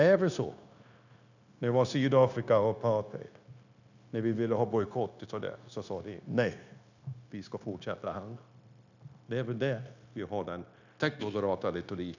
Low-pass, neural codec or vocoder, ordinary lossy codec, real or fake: 7.2 kHz; codec, 16 kHz, 0.9 kbps, LongCat-Audio-Codec; none; fake